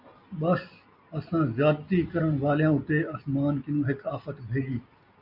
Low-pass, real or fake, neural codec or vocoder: 5.4 kHz; real; none